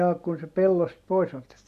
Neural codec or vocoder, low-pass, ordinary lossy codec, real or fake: none; 14.4 kHz; none; real